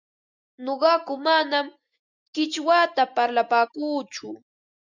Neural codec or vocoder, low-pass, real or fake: none; 7.2 kHz; real